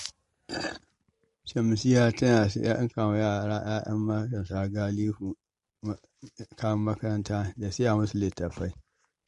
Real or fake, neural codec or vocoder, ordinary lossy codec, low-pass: real; none; MP3, 48 kbps; 14.4 kHz